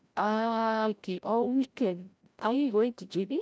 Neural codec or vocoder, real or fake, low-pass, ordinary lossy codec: codec, 16 kHz, 0.5 kbps, FreqCodec, larger model; fake; none; none